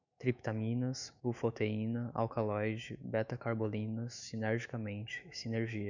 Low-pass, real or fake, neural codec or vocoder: 7.2 kHz; fake; autoencoder, 48 kHz, 128 numbers a frame, DAC-VAE, trained on Japanese speech